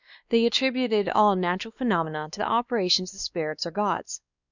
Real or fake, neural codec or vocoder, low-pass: fake; codec, 16 kHz, 2 kbps, X-Codec, WavLM features, trained on Multilingual LibriSpeech; 7.2 kHz